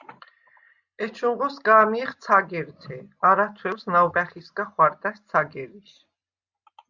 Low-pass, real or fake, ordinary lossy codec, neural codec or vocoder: 7.2 kHz; real; Opus, 64 kbps; none